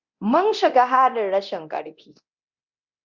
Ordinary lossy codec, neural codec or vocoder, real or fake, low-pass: Opus, 64 kbps; codec, 24 kHz, 0.9 kbps, DualCodec; fake; 7.2 kHz